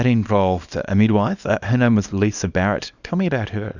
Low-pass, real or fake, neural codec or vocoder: 7.2 kHz; fake; codec, 24 kHz, 0.9 kbps, WavTokenizer, small release